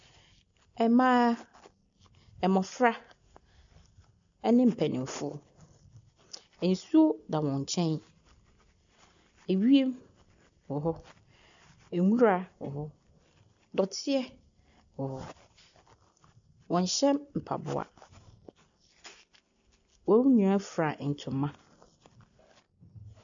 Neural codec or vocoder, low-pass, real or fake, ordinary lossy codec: none; 7.2 kHz; real; AAC, 64 kbps